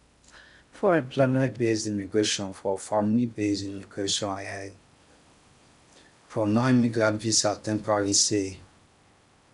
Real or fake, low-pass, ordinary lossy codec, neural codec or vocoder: fake; 10.8 kHz; none; codec, 16 kHz in and 24 kHz out, 0.6 kbps, FocalCodec, streaming, 2048 codes